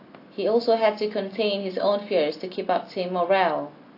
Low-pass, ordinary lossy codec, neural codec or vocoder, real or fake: 5.4 kHz; AAC, 32 kbps; none; real